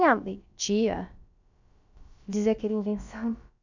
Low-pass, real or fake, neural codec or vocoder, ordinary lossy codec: 7.2 kHz; fake; codec, 16 kHz, about 1 kbps, DyCAST, with the encoder's durations; none